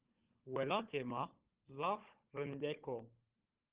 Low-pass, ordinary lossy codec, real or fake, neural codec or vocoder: 3.6 kHz; Opus, 16 kbps; fake; codec, 16 kHz, 16 kbps, FunCodec, trained on Chinese and English, 50 frames a second